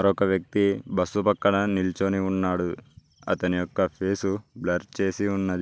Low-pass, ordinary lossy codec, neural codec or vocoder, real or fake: none; none; none; real